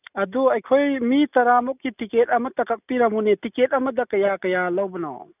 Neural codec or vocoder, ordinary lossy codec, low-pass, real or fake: none; none; 3.6 kHz; real